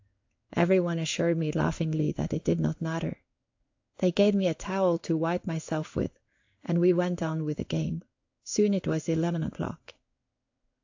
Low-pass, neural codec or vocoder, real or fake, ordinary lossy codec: 7.2 kHz; codec, 16 kHz in and 24 kHz out, 1 kbps, XY-Tokenizer; fake; AAC, 48 kbps